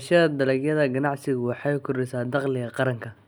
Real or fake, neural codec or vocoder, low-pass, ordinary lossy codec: real; none; none; none